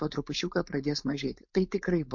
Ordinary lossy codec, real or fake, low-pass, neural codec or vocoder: MP3, 48 kbps; fake; 7.2 kHz; codec, 16 kHz, 4.8 kbps, FACodec